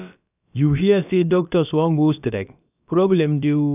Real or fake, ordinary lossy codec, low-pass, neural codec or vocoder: fake; none; 3.6 kHz; codec, 16 kHz, about 1 kbps, DyCAST, with the encoder's durations